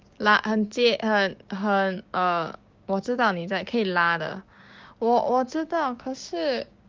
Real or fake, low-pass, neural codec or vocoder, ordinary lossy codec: fake; 7.2 kHz; codec, 16 kHz, 6 kbps, DAC; Opus, 32 kbps